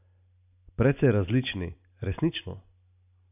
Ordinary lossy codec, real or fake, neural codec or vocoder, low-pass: none; real; none; 3.6 kHz